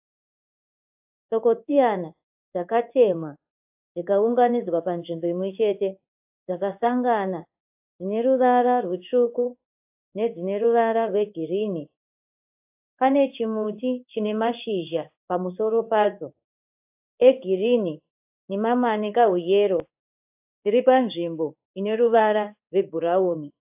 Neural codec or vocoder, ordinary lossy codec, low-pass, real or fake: codec, 16 kHz in and 24 kHz out, 1 kbps, XY-Tokenizer; AAC, 32 kbps; 3.6 kHz; fake